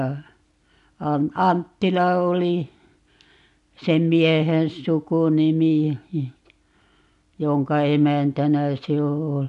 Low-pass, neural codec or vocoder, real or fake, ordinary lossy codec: 10.8 kHz; none; real; none